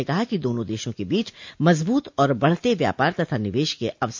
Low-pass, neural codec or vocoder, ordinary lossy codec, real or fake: 7.2 kHz; none; MP3, 48 kbps; real